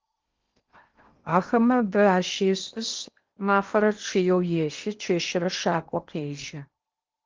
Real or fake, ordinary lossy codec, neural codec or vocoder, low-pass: fake; Opus, 32 kbps; codec, 16 kHz in and 24 kHz out, 0.8 kbps, FocalCodec, streaming, 65536 codes; 7.2 kHz